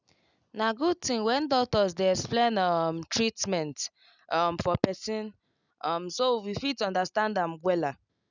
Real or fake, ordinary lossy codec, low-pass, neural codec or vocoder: real; none; 7.2 kHz; none